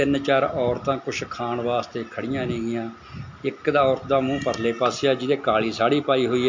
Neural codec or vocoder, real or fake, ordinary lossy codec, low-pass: none; real; MP3, 48 kbps; 7.2 kHz